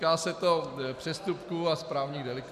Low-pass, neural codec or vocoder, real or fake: 14.4 kHz; none; real